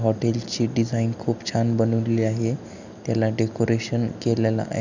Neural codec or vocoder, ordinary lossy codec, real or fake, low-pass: none; none; real; 7.2 kHz